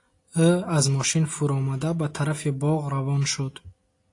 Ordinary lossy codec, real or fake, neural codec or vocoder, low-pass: AAC, 48 kbps; real; none; 10.8 kHz